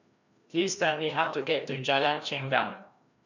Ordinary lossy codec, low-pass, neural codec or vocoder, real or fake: none; 7.2 kHz; codec, 16 kHz, 1 kbps, FreqCodec, larger model; fake